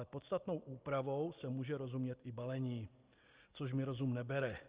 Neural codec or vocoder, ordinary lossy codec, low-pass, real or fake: none; Opus, 32 kbps; 3.6 kHz; real